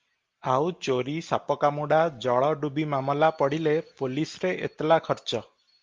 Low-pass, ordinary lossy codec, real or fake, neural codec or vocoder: 7.2 kHz; Opus, 32 kbps; real; none